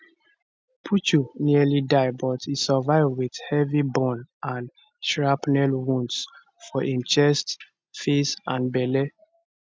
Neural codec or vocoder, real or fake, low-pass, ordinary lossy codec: none; real; none; none